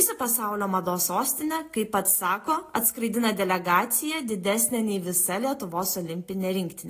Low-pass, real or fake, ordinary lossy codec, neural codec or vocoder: 14.4 kHz; real; AAC, 48 kbps; none